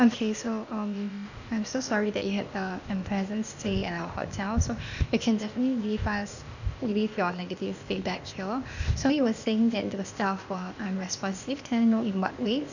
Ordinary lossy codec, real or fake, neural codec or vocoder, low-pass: none; fake; codec, 16 kHz, 0.8 kbps, ZipCodec; 7.2 kHz